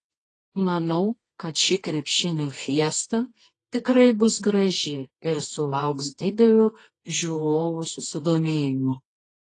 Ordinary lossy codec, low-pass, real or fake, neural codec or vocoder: AAC, 32 kbps; 10.8 kHz; fake; codec, 24 kHz, 0.9 kbps, WavTokenizer, medium music audio release